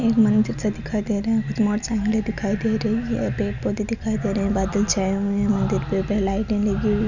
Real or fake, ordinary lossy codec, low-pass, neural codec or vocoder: real; none; 7.2 kHz; none